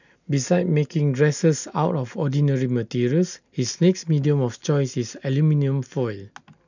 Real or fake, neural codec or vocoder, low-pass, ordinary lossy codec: real; none; 7.2 kHz; none